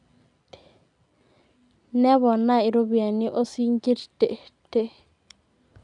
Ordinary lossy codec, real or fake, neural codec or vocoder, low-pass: none; real; none; 10.8 kHz